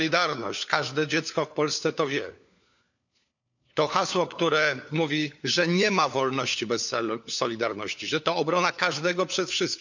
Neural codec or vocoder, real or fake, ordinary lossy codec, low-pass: codec, 16 kHz, 4 kbps, FunCodec, trained on LibriTTS, 50 frames a second; fake; none; 7.2 kHz